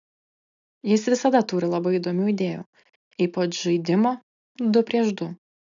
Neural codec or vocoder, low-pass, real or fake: none; 7.2 kHz; real